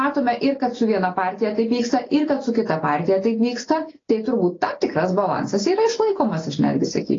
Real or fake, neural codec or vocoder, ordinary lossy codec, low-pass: real; none; AAC, 32 kbps; 7.2 kHz